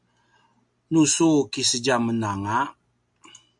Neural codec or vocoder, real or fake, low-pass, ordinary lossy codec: none; real; 10.8 kHz; MP3, 64 kbps